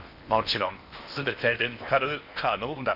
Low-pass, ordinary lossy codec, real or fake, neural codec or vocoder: 5.4 kHz; none; fake; codec, 16 kHz in and 24 kHz out, 0.8 kbps, FocalCodec, streaming, 65536 codes